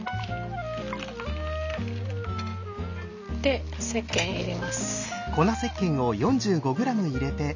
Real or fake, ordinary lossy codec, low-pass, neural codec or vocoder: real; none; 7.2 kHz; none